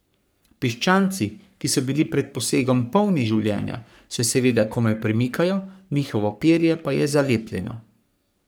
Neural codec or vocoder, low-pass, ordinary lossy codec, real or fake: codec, 44.1 kHz, 3.4 kbps, Pupu-Codec; none; none; fake